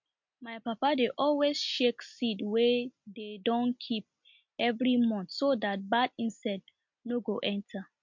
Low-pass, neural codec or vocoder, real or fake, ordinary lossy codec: 7.2 kHz; none; real; MP3, 48 kbps